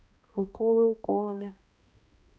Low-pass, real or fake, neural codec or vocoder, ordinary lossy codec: none; fake; codec, 16 kHz, 1 kbps, X-Codec, HuBERT features, trained on balanced general audio; none